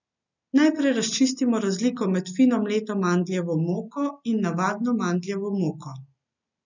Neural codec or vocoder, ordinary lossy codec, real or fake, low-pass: none; none; real; 7.2 kHz